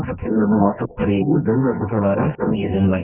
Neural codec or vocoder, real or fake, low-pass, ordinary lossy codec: codec, 24 kHz, 0.9 kbps, WavTokenizer, medium music audio release; fake; 3.6 kHz; none